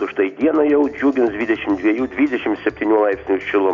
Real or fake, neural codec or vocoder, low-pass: real; none; 7.2 kHz